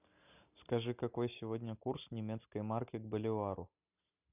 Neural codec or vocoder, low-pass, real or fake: none; 3.6 kHz; real